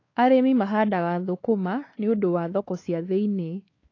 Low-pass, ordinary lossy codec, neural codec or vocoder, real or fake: 7.2 kHz; AAC, 32 kbps; codec, 16 kHz, 4 kbps, X-Codec, WavLM features, trained on Multilingual LibriSpeech; fake